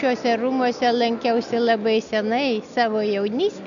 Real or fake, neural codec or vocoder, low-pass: real; none; 7.2 kHz